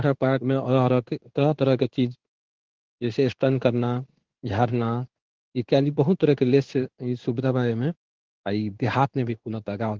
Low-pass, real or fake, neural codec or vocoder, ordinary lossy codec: 7.2 kHz; fake; codec, 16 kHz in and 24 kHz out, 1 kbps, XY-Tokenizer; Opus, 16 kbps